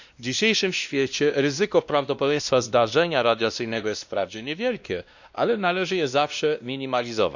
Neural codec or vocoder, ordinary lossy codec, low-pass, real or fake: codec, 16 kHz, 1 kbps, X-Codec, WavLM features, trained on Multilingual LibriSpeech; none; 7.2 kHz; fake